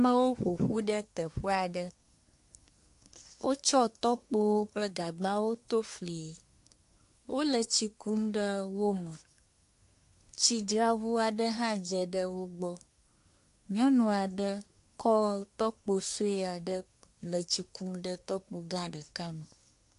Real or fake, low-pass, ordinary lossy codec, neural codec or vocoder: fake; 10.8 kHz; AAC, 64 kbps; codec, 24 kHz, 1 kbps, SNAC